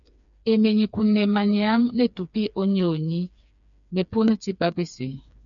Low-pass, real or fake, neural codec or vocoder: 7.2 kHz; fake; codec, 16 kHz, 4 kbps, FreqCodec, smaller model